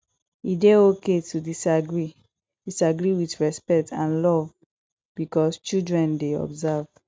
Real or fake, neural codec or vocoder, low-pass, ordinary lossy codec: real; none; none; none